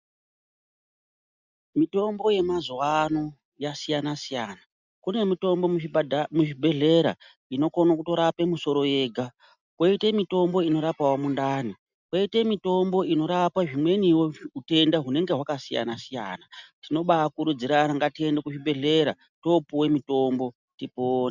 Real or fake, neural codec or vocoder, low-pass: real; none; 7.2 kHz